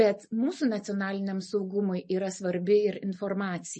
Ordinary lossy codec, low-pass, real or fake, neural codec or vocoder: MP3, 32 kbps; 10.8 kHz; real; none